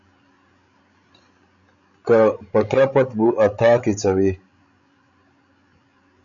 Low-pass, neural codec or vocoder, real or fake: 7.2 kHz; codec, 16 kHz, 16 kbps, FreqCodec, larger model; fake